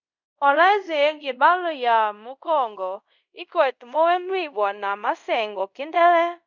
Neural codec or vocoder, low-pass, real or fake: codec, 24 kHz, 0.5 kbps, DualCodec; 7.2 kHz; fake